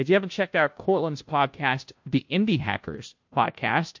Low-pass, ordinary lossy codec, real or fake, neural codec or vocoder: 7.2 kHz; MP3, 48 kbps; fake; codec, 16 kHz, 0.5 kbps, FunCodec, trained on Chinese and English, 25 frames a second